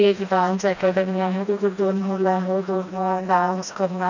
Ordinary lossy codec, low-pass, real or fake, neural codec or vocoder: none; 7.2 kHz; fake; codec, 16 kHz, 1 kbps, FreqCodec, smaller model